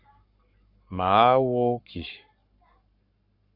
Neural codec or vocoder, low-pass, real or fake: codec, 44.1 kHz, 7.8 kbps, Pupu-Codec; 5.4 kHz; fake